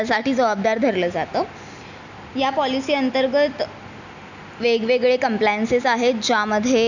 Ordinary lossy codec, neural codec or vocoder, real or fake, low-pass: none; none; real; 7.2 kHz